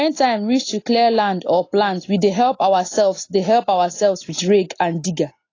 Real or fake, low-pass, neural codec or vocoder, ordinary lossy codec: real; 7.2 kHz; none; AAC, 32 kbps